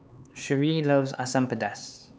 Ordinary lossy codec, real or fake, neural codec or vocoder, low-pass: none; fake; codec, 16 kHz, 4 kbps, X-Codec, HuBERT features, trained on LibriSpeech; none